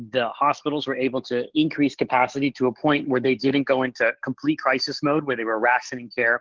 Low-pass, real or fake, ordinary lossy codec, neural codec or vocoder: 7.2 kHz; fake; Opus, 16 kbps; codec, 44.1 kHz, 7.8 kbps, DAC